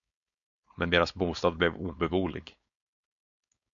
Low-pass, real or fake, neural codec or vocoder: 7.2 kHz; fake; codec, 16 kHz, 4.8 kbps, FACodec